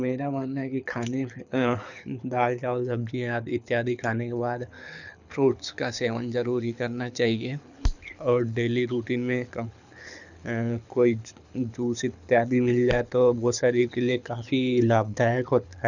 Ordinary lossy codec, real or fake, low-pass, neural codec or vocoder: none; fake; 7.2 kHz; codec, 24 kHz, 6 kbps, HILCodec